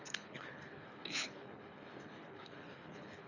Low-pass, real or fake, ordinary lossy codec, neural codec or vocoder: 7.2 kHz; fake; none; codec, 24 kHz, 3 kbps, HILCodec